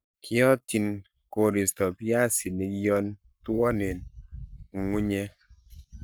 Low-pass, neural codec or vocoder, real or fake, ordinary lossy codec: none; codec, 44.1 kHz, 7.8 kbps, Pupu-Codec; fake; none